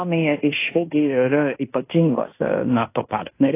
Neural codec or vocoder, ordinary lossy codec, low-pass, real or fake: codec, 16 kHz in and 24 kHz out, 0.9 kbps, LongCat-Audio-Codec, fine tuned four codebook decoder; AAC, 24 kbps; 3.6 kHz; fake